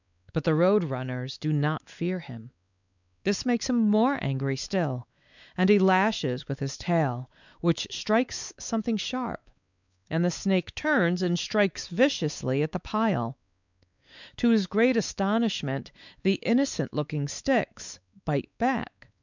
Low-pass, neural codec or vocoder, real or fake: 7.2 kHz; codec, 16 kHz, 4 kbps, X-Codec, WavLM features, trained on Multilingual LibriSpeech; fake